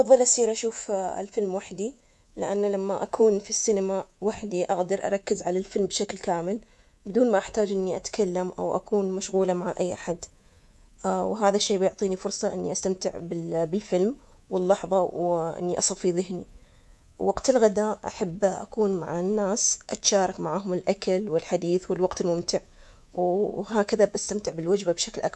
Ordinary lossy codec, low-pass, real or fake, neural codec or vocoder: none; none; fake; codec, 24 kHz, 3.1 kbps, DualCodec